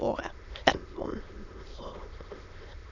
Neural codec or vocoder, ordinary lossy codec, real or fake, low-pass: autoencoder, 22.05 kHz, a latent of 192 numbers a frame, VITS, trained on many speakers; none; fake; 7.2 kHz